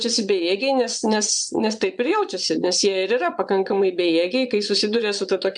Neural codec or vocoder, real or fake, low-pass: vocoder, 22.05 kHz, 80 mel bands, Vocos; fake; 9.9 kHz